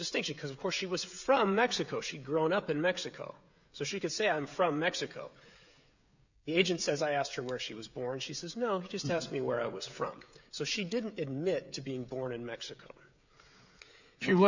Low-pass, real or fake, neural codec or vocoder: 7.2 kHz; fake; codec, 16 kHz, 16 kbps, FreqCodec, smaller model